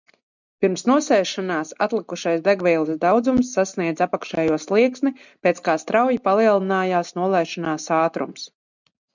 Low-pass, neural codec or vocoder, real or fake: 7.2 kHz; none; real